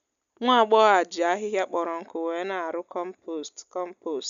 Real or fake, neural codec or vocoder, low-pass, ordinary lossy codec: real; none; 7.2 kHz; none